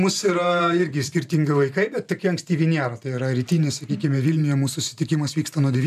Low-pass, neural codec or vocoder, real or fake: 14.4 kHz; vocoder, 44.1 kHz, 128 mel bands every 512 samples, BigVGAN v2; fake